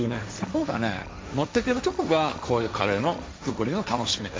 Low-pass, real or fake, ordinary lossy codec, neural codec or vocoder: none; fake; none; codec, 16 kHz, 1.1 kbps, Voila-Tokenizer